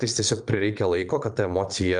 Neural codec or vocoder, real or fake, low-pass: vocoder, 22.05 kHz, 80 mel bands, Vocos; fake; 9.9 kHz